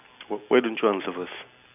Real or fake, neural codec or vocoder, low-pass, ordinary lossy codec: real; none; 3.6 kHz; none